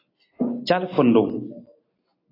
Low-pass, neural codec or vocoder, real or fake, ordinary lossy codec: 5.4 kHz; none; real; AAC, 24 kbps